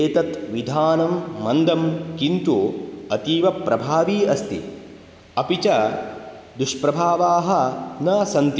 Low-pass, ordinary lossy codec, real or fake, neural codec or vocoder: none; none; real; none